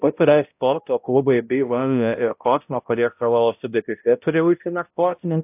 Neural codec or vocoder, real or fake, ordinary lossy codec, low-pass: codec, 16 kHz, 0.5 kbps, X-Codec, HuBERT features, trained on balanced general audio; fake; AAC, 32 kbps; 3.6 kHz